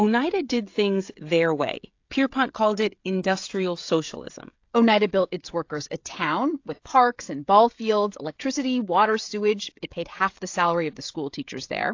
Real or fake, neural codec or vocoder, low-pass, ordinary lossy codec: fake; codec, 16 kHz, 16 kbps, FreqCodec, smaller model; 7.2 kHz; AAC, 48 kbps